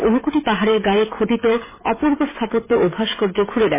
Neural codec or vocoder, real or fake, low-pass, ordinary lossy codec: codec, 16 kHz, 16 kbps, FreqCodec, smaller model; fake; 3.6 kHz; MP3, 16 kbps